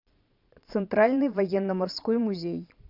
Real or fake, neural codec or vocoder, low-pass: fake; vocoder, 22.05 kHz, 80 mel bands, Vocos; 5.4 kHz